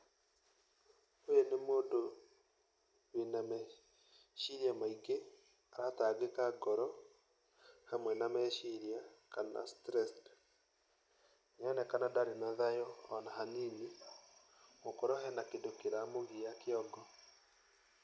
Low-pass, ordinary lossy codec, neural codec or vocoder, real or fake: none; none; none; real